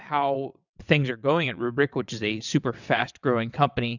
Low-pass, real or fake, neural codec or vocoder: 7.2 kHz; fake; vocoder, 22.05 kHz, 80 mel bands, WaveNeXt